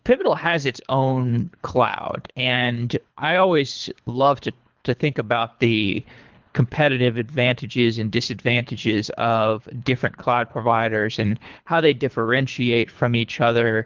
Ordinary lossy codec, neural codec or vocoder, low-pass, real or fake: Opus, 32 kbps; codec, 24 kHz, 3 kbps, HILCodec; 7.2 kHz; fake